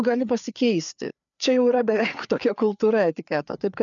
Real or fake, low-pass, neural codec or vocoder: fake; 7.2 kHz; codec, 16 kHz, 4 kbps, FreqCodec, larger model